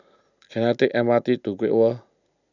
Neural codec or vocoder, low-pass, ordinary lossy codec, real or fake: none; 7.2 kHz; none; real